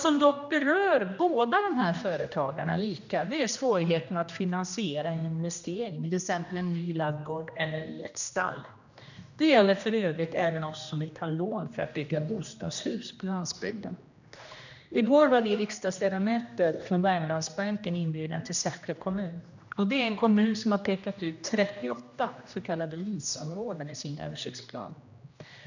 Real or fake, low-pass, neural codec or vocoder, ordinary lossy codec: fake; 7.2 kHz; codec, 16 kHz, 1 kbps, X-Codec, HuBERT features, trained on general audio; none